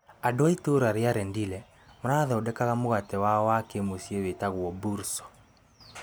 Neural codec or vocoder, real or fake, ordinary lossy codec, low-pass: none; real; none; none